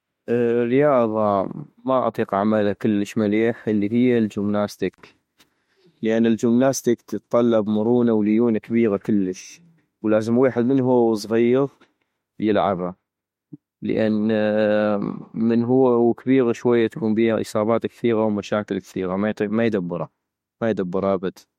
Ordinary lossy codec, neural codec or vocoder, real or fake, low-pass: MP3, 64 kbps; autoencoder, 48 kHz, 32 numbers a frame, DAC-VAE, trained on Japanese speech; fake; 19.8 kHz